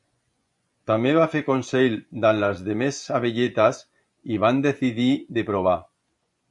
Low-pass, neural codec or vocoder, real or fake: 10.8 kHz; vocoder, 24 kHz, 100 mel bands, Vocos; fake